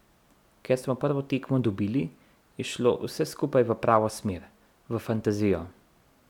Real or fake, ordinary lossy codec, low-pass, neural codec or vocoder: real; none; 19.8 kHz; none